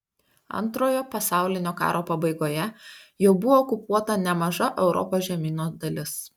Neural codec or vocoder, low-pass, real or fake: none; 19.8 kHz; real